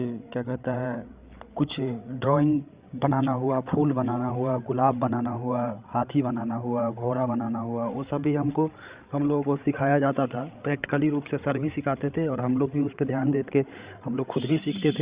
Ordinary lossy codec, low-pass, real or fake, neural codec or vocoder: Opus, 64 kbps; 3.6 kHz; fake; codec, 16 kHz, 8 kbps, FreqCodec, larger model